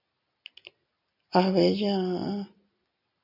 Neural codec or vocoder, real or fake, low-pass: none; real; 5.4 kHz